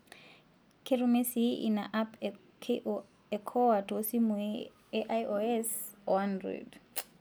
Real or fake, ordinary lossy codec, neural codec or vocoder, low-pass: real; none; none; none